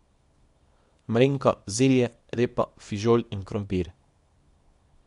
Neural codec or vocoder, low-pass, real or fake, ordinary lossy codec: codec, 24 kHz, 0.9 kbps, WavTokenizer, small release; 10.8 kHz; fake; MP3, 64 kbps